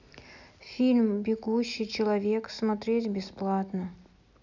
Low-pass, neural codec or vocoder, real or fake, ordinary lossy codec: 7.2 kHz; none; real; none